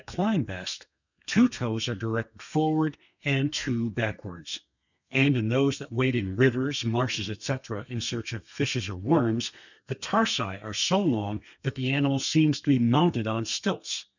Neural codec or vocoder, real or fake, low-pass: codec, 32 kHz, 1.9 kbps, SNAC; fake; 7.2 kHz